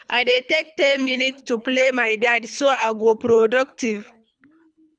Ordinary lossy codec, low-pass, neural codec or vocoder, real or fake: none; 9.9 kHz; codec, 24 kHz, 3 kbps, HILCodec; fake